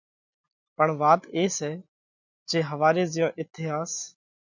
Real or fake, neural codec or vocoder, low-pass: real; none; 7.2 kHz